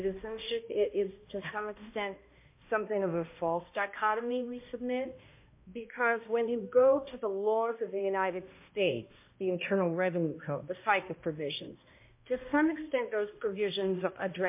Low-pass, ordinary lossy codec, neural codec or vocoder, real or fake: 3.6 kHz; MP3, 24 kbps; codec, 16 kHz, 1 kbps, X-Codec, HuBERT features, trained on balanced general audio; fake